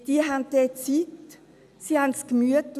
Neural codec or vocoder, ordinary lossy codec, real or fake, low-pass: none; none; real; 14.4 kHz